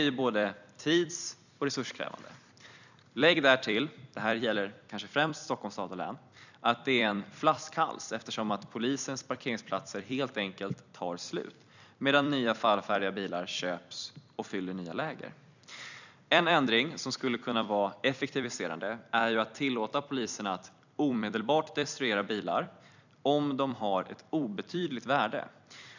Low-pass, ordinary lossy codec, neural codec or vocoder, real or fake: 7.2 kHz; none; vocoder, 44.1 kHz, 128 mel bands every 256 samples, BigVGAN v2; fake